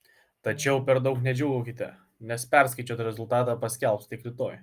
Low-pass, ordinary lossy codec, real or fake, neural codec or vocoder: 14.4 kHz; Opus, 32 kbps; real; none